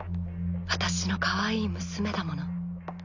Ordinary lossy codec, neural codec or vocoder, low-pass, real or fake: none; none; 7.2 kHz; real